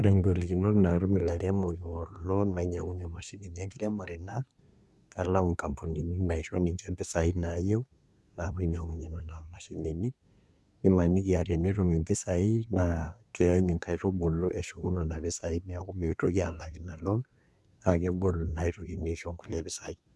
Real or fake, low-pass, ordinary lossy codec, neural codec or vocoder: fake; none; none; codec, 24 kHz, 1 kbps, SNAC